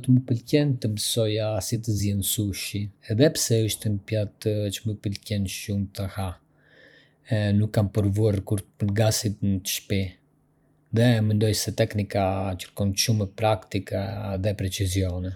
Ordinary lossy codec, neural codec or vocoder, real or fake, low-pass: none; vocoder, 44.1 kHz, 128 mel bands every 512 samples, BigVGAN v2; fake; 19.8 kHz